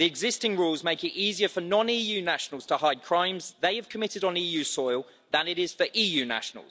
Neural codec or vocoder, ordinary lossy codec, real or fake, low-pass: none; none; real; none